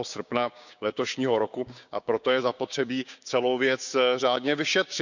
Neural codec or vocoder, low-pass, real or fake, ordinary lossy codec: codec, 16 kHz, 6 kbps, DAC; 7.2 kHz; fake; none